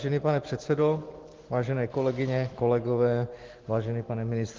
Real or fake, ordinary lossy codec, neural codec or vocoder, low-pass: real; Opus, 16 kbps; none; 7.2 kHz